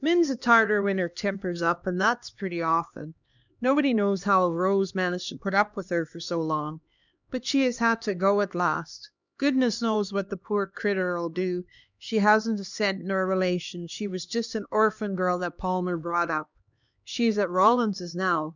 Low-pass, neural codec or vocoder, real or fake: 7.2 kHz; codec, 16 kHz, 2 kbps, X-Codec, HuBERT features, trained on LibriSpeech; fake